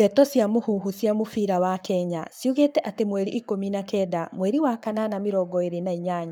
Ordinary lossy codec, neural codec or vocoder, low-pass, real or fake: none; codec, 44.1 kHz, 7.8 kbps, Pupu-Codec; none; fake